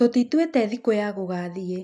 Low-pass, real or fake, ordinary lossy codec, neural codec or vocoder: none; real; none; none